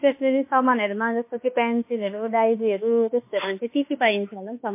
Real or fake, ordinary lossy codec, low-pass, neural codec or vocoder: fake; MP3, 24 kbps; 3.6 kHz; autoencoder, 48 kHz, 32 numbers a frame, DAC-VAE, trained on Japanese speech